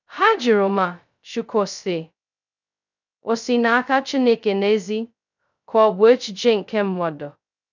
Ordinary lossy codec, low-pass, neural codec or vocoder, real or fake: none; 7.2 kHz; codec, 16 kHz, 0.2 kbps, FocalCodec; fake